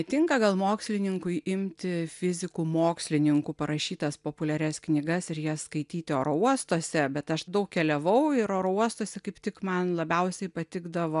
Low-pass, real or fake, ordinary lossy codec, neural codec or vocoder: 10.8 kHz; real; MP3, 96 kbps; none